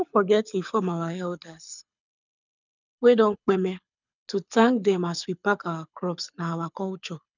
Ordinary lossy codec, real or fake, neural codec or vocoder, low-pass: none; fake; codec, 24 kHz, 6 kbps, HILCodec; 7.2 kHz